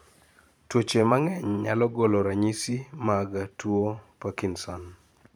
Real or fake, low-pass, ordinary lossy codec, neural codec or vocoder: fake; none; none; vocoder, 44.1 kHz, 128 mel bands every 512 samples, BigVGAN v2